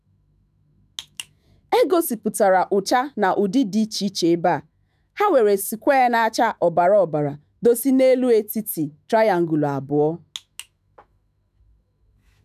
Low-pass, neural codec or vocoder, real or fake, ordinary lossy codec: 14.4 kHz; autoencoder, 48 kHz, 128 numbers a frame, DAC-VAE, trained on Japanese speech; fake; none